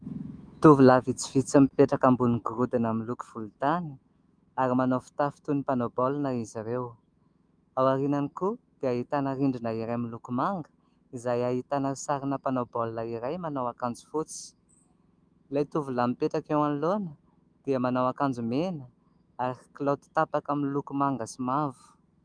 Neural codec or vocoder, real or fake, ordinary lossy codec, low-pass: autoencoder, 48 kHz, 128 numbers a frame, DAC-VAE, trained on Japanese speech; fake; Opus, 32 kbps; 9.9 kHz